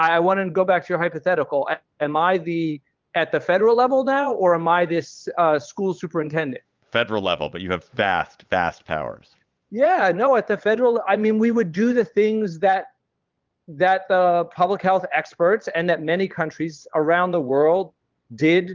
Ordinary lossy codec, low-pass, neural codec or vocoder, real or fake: Opus, 24 kbps; 7.2 kHz; vocoder, 22.05 kHz, 80 mel bands, Vocos; fake